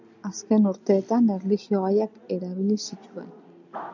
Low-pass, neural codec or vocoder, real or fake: 7.2 kHz; none; real